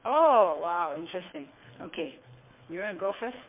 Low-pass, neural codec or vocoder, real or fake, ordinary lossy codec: 3.6 kHz; codec, 24 kHz, 3 kbps, HILCodec; fake; MP3, 32 kbps